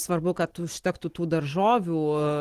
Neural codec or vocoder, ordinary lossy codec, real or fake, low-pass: none; Opus, 16 kbps; real; 14.4 kHz